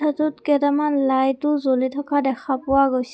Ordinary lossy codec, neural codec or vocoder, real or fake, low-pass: none; none; real; none